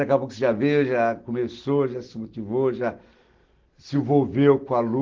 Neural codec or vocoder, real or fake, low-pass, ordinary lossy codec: none; real; 7.2 kHz; Opus, 16 kbps